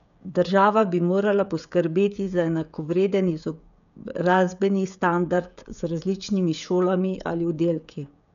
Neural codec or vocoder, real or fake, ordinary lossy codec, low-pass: codec, 16 kHz, 16 kbps, FreqCodec, smaller model; fake; none; 7.2 kHz